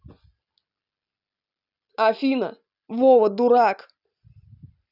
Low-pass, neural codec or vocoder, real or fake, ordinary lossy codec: 5.4 kHz; none; real; none